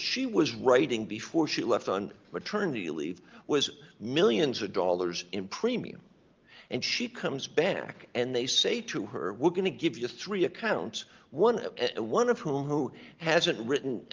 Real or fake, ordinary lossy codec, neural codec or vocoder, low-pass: real; Opus, 32 kbps; none; 7.2 kHz